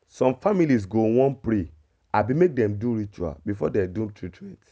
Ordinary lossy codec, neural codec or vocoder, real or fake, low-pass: none; none; real; none